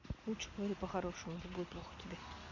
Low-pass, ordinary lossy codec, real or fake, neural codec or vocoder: 7.2 kHz; MP3, 64 kbps; real; none